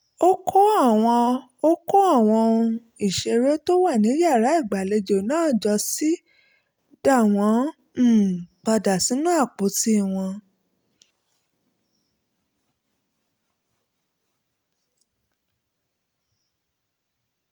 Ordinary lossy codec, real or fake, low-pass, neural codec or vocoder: none; real; none; none